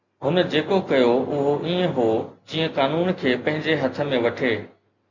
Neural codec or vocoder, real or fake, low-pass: none; real; 7.2 kHz